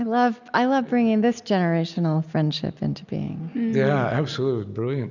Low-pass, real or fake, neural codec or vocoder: 7.2 kHz; real; none